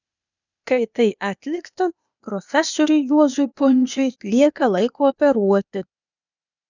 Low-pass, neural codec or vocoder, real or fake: 7.2 kHz; codec, 16 kHz, 0.8 kbps, ZipCodec; fake